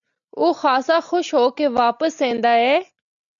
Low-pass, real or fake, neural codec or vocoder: 7.2 kHz; real; none